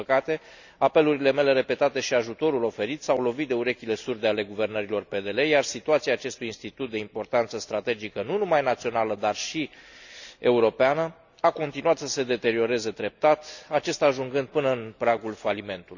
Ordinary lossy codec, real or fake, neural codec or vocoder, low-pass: none; real; none; 7.2 kHz